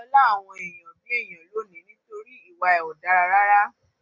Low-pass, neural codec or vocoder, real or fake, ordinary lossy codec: 7.2 kHz; none; real; MP3, 48 kbps